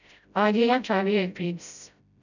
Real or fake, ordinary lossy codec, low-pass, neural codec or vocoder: fake; none; 7.2 kHz; codec, 16 kHz, 0.5 kbps, FreqCodec, smaller model